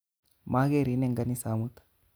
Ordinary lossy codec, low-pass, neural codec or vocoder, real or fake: none; none; none; real